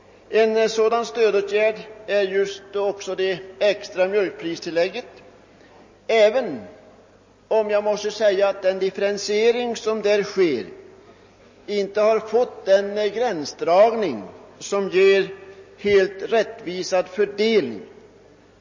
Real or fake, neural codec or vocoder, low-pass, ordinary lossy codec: real; none; 7.2 kHz; MP3, 32 kbps